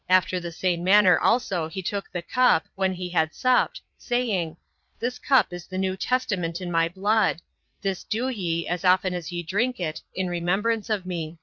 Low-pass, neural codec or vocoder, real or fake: 7.2 kHz; vocoder, 22.05 kHz, 80 mel bands, Vocos; fake